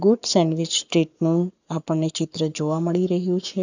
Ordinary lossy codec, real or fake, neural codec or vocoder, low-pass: none; fake; codec, 44.1 kHz, 7.8 kbps, Pupu-Codec; 7.2 kHz